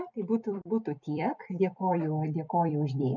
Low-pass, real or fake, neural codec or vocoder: 7.2 kHz; fake; vocoder, 44.1 kHz, 128 mel bands every 256 samples, BigVGAN v2